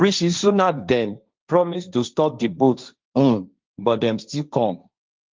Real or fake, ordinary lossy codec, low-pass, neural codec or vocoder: fake; Opus, 24 kbps; 7.2 kHz; codec, 16 kHz, 1.1 kbps, Voila-Tokenizer